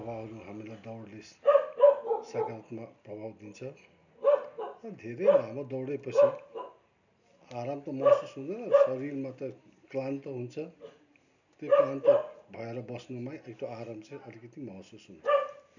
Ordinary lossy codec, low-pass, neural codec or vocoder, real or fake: none; 7.2 kHz; none; real